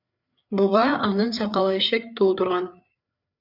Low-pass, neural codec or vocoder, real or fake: 5.4 kHz; codec, 16 kHz, 4 kbps, FreqCodec, larger model; fake